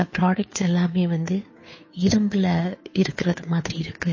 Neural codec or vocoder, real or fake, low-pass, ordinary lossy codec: codec, 16 kHz, 2 kbps, FunCodec, trained on Chinese and English, 25 frames a second; fake; 7.2 kHz; MP3, 32 kbps